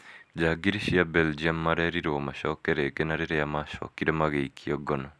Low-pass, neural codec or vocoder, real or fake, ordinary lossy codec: none; none; real; none